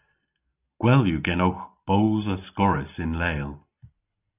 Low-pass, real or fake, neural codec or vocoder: 3.6 kHz; real; none